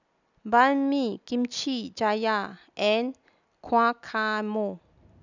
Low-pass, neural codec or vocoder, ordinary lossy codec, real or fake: 7.2 kHz; none; none; real